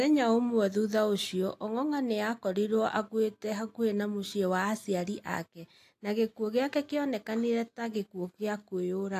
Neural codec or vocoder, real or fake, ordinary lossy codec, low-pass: none; real; AAC, 64 kbps; 14.4 kHz